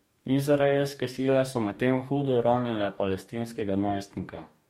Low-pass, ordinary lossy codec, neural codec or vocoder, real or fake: 19.8 kHz; MP3, 64 kbps; codec, 44.1 kHz, 2.6 kbps, DAC; fake